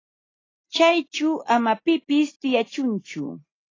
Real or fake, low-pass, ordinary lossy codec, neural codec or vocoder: real; 7.2 kHz; AAC, 32 kbps; none